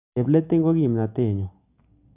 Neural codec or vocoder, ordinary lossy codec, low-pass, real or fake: none; none; 3.6 kHz; real